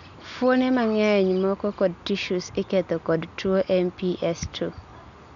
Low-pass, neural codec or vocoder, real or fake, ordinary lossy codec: 7.2 kHz; none; real; none